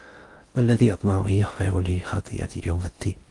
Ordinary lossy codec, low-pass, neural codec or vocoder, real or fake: Opus, 32 kbps; 10.8 kHz; codec, 16 kHz in and 24 kHz out, 0.6 kbps, FocalCodec, streaming, 4096 codes; fake